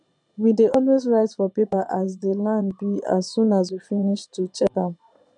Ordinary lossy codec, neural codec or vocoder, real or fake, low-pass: none; vocoder, 22.05 kHz, 80 mel bands, Vocos; fake; 9.9 kHz